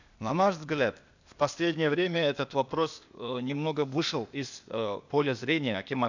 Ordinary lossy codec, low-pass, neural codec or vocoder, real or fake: none; 7.2 kHz; codec, 16 kHz, 0.8 kbps, ZipCodec; fake